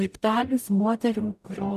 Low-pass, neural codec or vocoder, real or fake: 14.4 kHz; codec, 44.1 kHz, 0.9 kbps, DAC; fake